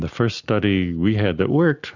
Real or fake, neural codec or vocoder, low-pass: real; none; 7.2 kHz